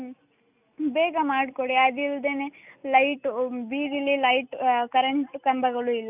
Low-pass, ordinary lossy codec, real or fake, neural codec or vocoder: 3.6 kHz; none; real; none